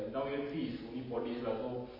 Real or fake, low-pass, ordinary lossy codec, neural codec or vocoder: real; 5.4 kHz; MP3, 24 kbps; none